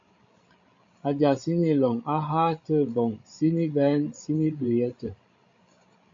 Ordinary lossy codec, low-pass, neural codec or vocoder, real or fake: MP3, 48 kbps; 7.2 kHz; codec, 16 kHz, 16 kbps, FreqCodec, larger model; fake